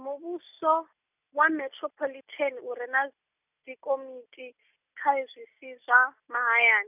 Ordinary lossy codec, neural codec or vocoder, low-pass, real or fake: none; none; 3.6 kHz; real